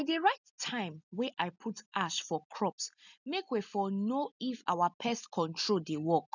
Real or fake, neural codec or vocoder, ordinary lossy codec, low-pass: real; none; none; 7.2 kHz